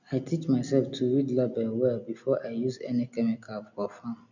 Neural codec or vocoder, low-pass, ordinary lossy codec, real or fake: none; 7.2 kHz; none; real